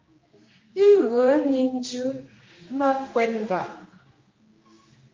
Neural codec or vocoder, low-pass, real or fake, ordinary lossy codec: codec, 16 kHz, 1 kbps, X-Codec, HuBERT features, trained on general audio; 7.2 kHz; fake; Opus, 24 kbps